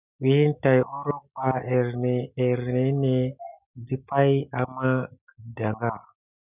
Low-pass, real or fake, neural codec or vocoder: 3.6 kHz; real; none